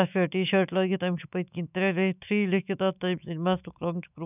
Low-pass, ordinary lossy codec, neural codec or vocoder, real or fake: 3.6 kHz; none; autoencoder, 48 kHz, 128 numbers a frame, DAC-VAE, trained on Japanese speech; fake